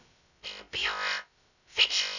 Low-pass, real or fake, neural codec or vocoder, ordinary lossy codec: 7.2 kHz; fake; codec, 16 kHz, 0.2 kbps, FocalCodec; none